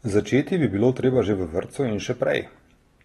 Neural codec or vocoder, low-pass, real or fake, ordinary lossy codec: none; 19.8 kHz; real; AAC, 32 kbps